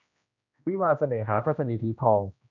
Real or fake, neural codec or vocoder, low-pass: fake; codec, 16 kHz, 1 kbps, X-Codec, HuBERT features, trained on general audio; 7.2 kHz